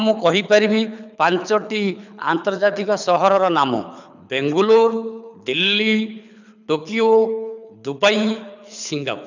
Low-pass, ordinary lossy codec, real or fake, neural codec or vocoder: 7.2 kHz; none; fake; codec, 24 kHz, 6 kbps, HILCodec